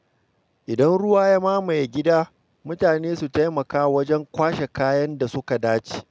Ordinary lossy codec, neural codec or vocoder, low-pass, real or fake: none; none; none; real